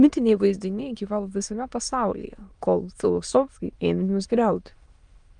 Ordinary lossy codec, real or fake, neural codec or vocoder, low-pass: Opus, 32 kbps; fake; autoencoder, 22.05 kHz, a latent of 192 numbers a frame, VITS, trained on many speakers; 9.9 kHz